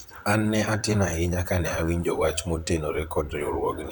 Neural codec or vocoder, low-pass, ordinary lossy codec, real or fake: vocoder, 44.1 kHz, 128 mel bands, Pupu-Vocoder; none; none; fake